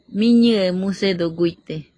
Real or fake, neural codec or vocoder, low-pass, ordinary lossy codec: real; none; 9.9 kHz; AAC, 32 kbps